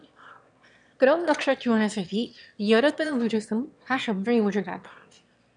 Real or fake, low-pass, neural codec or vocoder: fake; 9.9 kHz; autoencoder, 22.05 kHz, a latent of 192 numbers a frame, VITS, trained on one speaker